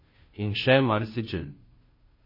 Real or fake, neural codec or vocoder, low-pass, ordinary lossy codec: fake; codec, 16 kHz, 0.5 kbps, FunCodec, trained on Chinese and English, 25 frames a second; 5.4 kHz; MP3, 24 kbps